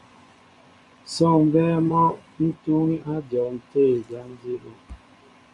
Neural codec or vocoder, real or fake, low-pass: vocoder, 24 kHz, 100 mel bands, Vocos; fake; 10.8 kHz